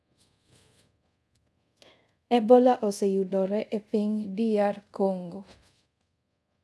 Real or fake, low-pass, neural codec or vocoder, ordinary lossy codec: fake; none; codec, 24 kHz, 0.5 kbps, DualCodec; none